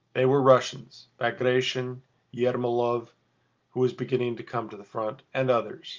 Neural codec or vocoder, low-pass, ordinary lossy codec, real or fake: none; 7.2 kHz; Opus, 24 kbps; real